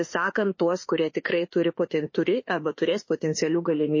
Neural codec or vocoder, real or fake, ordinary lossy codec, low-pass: autoencoder, 48 kHz, 32 numbers a frame, DAC-VAE, trained on Japanese speech; fake; MP3, 32 kbps; 7.2 kHz